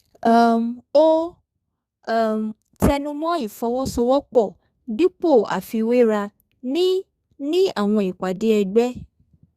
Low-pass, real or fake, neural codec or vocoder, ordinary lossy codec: 14.4 kHz; fake; codec, 32 kHz, 1.9 kbps, SNAC; Opus, 64 kbps